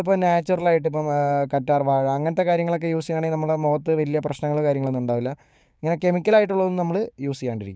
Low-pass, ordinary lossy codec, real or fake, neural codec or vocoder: none; none; fake; codec, 16 kHz, 6 kbps, DAC